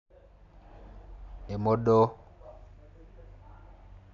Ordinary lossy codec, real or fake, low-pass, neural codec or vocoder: none; real; 7.2 kHz; none